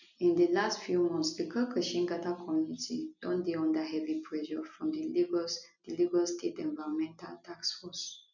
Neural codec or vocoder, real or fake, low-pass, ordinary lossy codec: none; real; 7.2 kHz; none